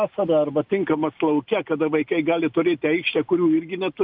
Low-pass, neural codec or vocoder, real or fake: 5.4 kHz; none; real